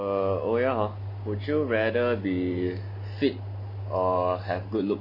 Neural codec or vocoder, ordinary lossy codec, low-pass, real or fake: none; none; 5.4 kHz; real